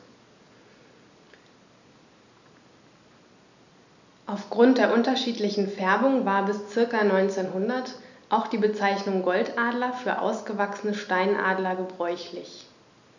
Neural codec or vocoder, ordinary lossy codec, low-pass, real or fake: none; none; 7.2 kHz; real